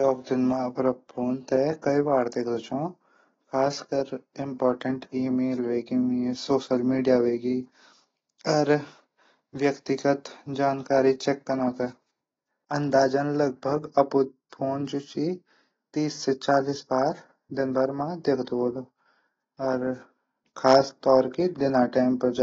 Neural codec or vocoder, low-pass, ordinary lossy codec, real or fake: none; 7.2 kHz; AAC, 24 kbps; real